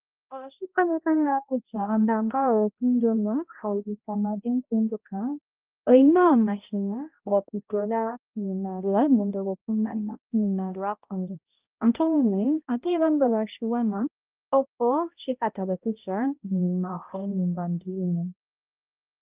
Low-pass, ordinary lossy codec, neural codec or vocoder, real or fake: 3.6 kHz; Opus, 32 kbps; codec, 16 kHz, 0.5 kbps, X-Codec, HuBERT features, trained on balanced general audio; fake